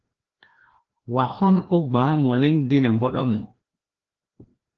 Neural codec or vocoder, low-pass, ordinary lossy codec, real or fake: codec, 16 kHz, 1 kbps, FreqCodec, larger model; 7.2 kHz; Opus, 16 kbps; fake